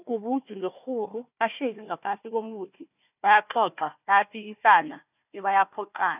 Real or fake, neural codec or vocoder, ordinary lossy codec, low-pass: fake; codec, 16 kHz, 1 kbps, FunCodec, trained on Chinese and English, 50 frames a second; none; 3.6 kHz